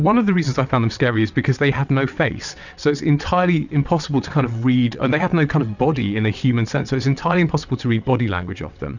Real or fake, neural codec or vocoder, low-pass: fake; vocoder, 22.05 kHz, 80 mel bands, WaveNeXt; 7.2 kHz